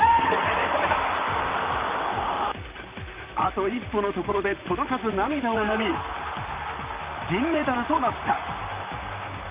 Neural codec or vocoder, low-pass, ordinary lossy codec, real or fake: vocoder, 44.1 kHz, 128 mel bands, Pupu-Vocoder; 3.6 kHz; Opus, 32 kbps; fake